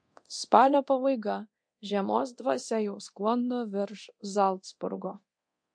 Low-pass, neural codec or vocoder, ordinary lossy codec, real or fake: 9.9 kHz; codec, 24 kHz, 0.9 kbps, DualCodec; MP3, 48 kbps; fake